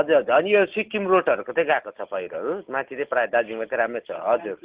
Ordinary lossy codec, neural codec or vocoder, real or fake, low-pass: Opus, 16 kbps; none; real; 3.6 kHz